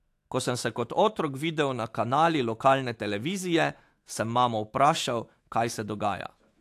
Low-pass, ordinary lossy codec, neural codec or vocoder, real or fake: 14.4 kHz; AAC, 64 kbps; autoencoder, 48 kHz, 128 numbers a frame, DAC-VAE, trained on Japanese speech; fake